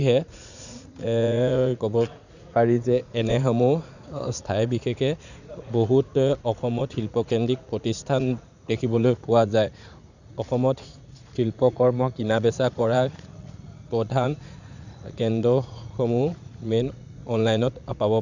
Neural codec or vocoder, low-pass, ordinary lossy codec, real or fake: vocoder, 44.1 kHz, 80 mel bands, Vocos; 7.2 kHz; none; fake